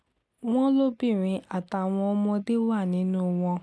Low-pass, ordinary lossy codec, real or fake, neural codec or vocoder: none; none; real; none